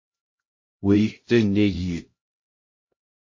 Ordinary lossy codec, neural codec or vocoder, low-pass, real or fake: MP3, 32 kbps; codec, 16 kHz, 0.5 kbps, X-Codec, HuBERT features, trained on LibriSpeech; 7.2 kHz; fake